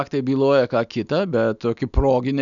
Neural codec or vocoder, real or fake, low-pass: none; real; 7.2 kHz